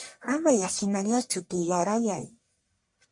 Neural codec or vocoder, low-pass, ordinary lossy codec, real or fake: codec, 44.1 kHz, 1.7 kbps, Pupu-Codec; 10.8 kHz; MP3, 48 kbps; fake